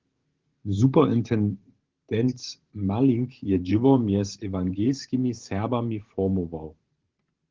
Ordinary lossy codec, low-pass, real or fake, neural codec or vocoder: Opus, 16 kbps; 7.2 kHz; fake; vocoder, 44.1 kHz, 128 mel bands every 512 samples, BigVGAN v2